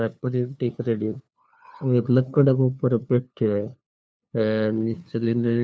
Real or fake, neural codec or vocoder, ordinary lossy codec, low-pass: fake; codec, 16 kHz, 2 kbps, FunCodec, trained on LibriTTS, 25 frames a second; none; none